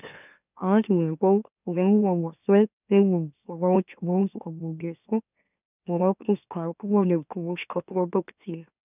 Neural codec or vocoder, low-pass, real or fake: autoencoder, 44.1 kHz, a latent of 192 numbers a frame, MeloTTS; 3.6 kHz; fake